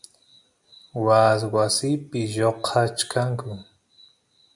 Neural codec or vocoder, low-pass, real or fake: none; 10.8 kHz; real